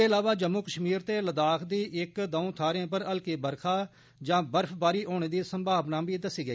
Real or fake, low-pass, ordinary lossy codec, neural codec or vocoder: real; none; none; none